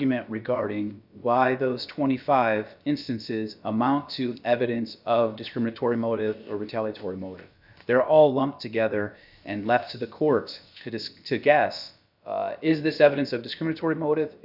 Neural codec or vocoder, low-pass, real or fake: codec, 16 kHz, about 1 kbps, DyCAST, with the encoder's durations; 5.4 kHz; fake